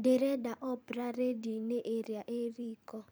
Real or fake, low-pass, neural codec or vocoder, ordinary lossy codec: fake; none; vocoder, 44.1 kHz, 128 mel bands every 512 samples, BigVGAN v2; none